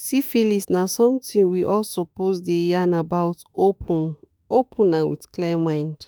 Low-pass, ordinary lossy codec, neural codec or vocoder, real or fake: none; none; autoencoder, 48 kHz, 32 numbers a frame, DAC-VAE, trained on Japanese speech; fake